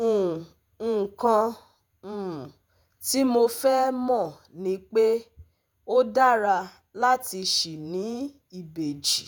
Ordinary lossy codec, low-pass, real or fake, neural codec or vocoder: none; none; fake; vocoder, 48 kHz, 128 mel bands, Vocos